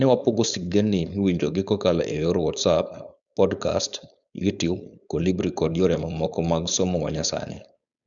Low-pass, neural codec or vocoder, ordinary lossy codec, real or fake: 7.2 kHz; codec, 16 kHz, 4.8 kbps, FACodec; none; fake